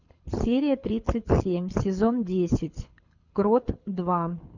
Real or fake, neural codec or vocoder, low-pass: fake; codec, 24 kHz, 6 kbps, HILCodec; 7.2 kHz